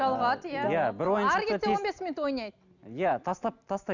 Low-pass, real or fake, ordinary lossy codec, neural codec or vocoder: 7.2 kHz; real; none; none